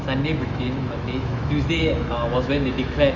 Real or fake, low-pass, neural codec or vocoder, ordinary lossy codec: fake; 7.2 kHz; autoencoder, 48 kHz, 128 numbers a frame, DAC-VAE, trained on Japanese speech; none